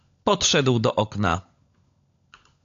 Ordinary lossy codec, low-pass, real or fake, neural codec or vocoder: AAC, 48 kbps; 7.2 kHz; fake; codec, 16 kHz, 16 kbps, FunCodec, trained on LibriTTS, 50 frames a second